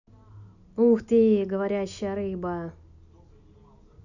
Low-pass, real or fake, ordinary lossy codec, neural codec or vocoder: 7.2 kHz; real; none; none